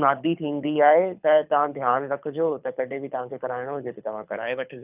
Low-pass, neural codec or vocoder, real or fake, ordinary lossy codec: 3.6 kHz; codec, 24 kHz, 6 kbps, HILCodec; fake; none